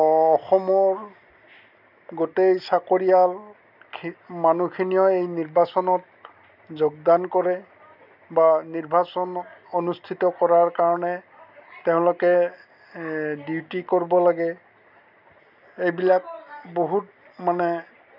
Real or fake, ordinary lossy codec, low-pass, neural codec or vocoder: real; none; 5.4 kHz; none